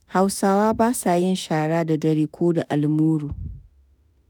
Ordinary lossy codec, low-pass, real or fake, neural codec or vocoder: none; none; fake; autoencoder, 48 kHz, 32 numbers a frame, DAC-VAE, trained on Japanese speech